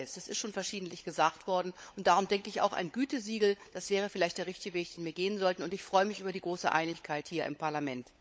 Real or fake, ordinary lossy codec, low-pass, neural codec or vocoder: fake; none; none; codec, 16 kHz, 16 kbps, FunCodec, trained on LibriTTS, 50 frames a second